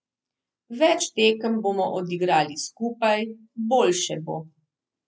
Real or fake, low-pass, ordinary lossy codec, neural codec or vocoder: real; none; none; none